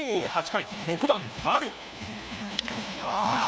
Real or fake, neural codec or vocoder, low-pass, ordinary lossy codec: fake; codec, 16 kHz, 1 kbps, FunCodec, trained on LibriTTS, 50 frames a second; none; none